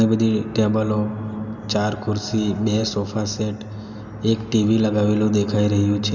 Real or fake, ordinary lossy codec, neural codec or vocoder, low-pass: real; none; none; 7.2 kHz